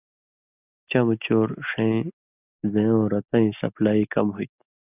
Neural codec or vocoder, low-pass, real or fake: none; 3.6 kHz; real